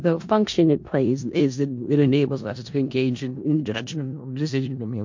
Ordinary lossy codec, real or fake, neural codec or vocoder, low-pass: MP3, 48 kbps; fake; codec, 16 kHz in and 24 kHz out, 0.4 kbps, LongCat-Audio-Codec, four codebook decoder; 7.2 kHz